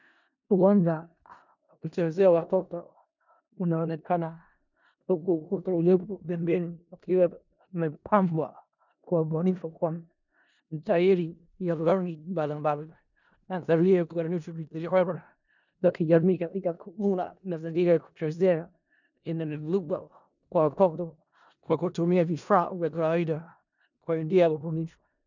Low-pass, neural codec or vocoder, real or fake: 7.2 kHz; codec, 16 kHz in and 24 kHz out, 0.4 kbps, LongCat-Audio-Codec, four codebook decoder; fake